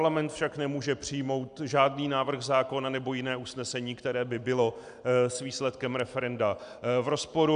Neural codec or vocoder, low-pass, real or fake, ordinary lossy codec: none; 9.9 kHz; real; AAC, 96 kbps